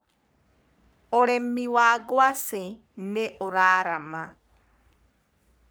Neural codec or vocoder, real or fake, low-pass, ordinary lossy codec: codec, 44.1 kHz, 3.4 kbps, Pupu-Codec; fake; none; none